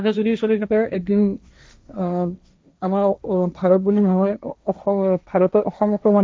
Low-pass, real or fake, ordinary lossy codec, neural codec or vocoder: none; fake; none; codec, 16 kHz, 1.1 kbps, Voila-Tokenizer